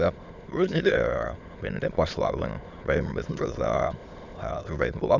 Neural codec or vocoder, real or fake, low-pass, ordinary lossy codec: autoencoder, 22.05 kHz, a latent of 192 numbers a frame, VITS, trained on many speakers; fake; 7.2 kHz; none